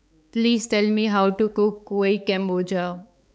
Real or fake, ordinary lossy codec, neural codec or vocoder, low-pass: fake; none; codec, 16 kHz, 4 kbps, X-Codec, HuBERT features, trained on balanced general audio; none